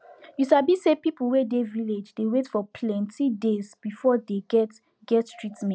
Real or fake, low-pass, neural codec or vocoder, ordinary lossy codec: real; none; none; none